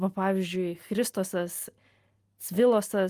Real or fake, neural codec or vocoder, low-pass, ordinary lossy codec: real; none; 14.4 kHz; Opus, 32 kbps